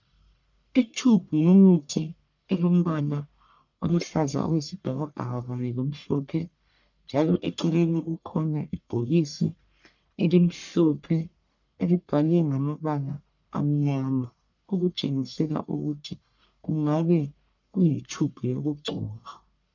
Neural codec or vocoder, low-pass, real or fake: codec, 44.1 kHz, 1.7 kbps, Pupu-Codec; 7.2 kHz; fake